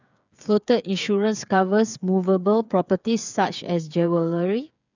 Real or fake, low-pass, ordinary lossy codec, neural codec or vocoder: fake; 7.2 kHz; none; codec, 16 kHz, 8 kbps, FreqCodec, smaller model